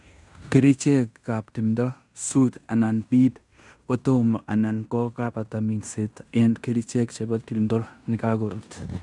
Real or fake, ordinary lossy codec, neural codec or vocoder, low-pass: fake; none; codec, 16 kHz in and 24 kHz out, 0.9 kbps, LongCat-Audio-Codec, fine tuned four codebook decoder; 10.8 kHz